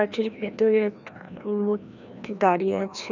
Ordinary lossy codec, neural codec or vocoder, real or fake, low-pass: none; codec, 16 kHz, 1 kbps, FreqCodec, larger model; fake; 7.2 kHz